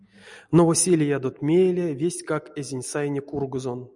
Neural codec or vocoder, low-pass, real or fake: none; 10.8 kHz; real